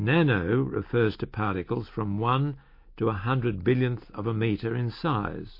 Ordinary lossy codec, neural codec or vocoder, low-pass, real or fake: MP3, 32 kbps; none; 5.4 kHz; real